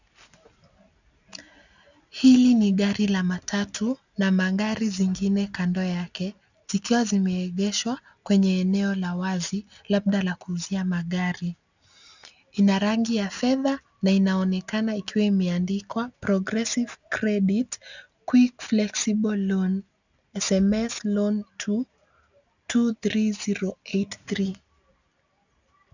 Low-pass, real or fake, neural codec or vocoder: 7.2 kHz; real; none